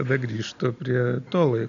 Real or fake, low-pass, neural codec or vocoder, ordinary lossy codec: real; 7.2 kHz; none; MP3, 96 kbps